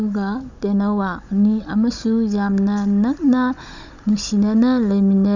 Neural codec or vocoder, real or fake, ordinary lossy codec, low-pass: codec, 16 kHz, 16 kbps, FunCodec, trained on Chinese and English, 50 frames a second; fake; none; 7.2 kHz